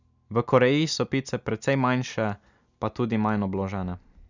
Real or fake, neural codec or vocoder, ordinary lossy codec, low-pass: real; none; none; 7.2 kHz